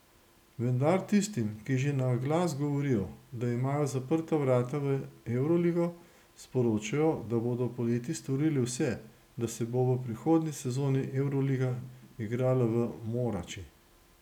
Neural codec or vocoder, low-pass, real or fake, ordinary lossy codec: none; 19.8 kHz; real; none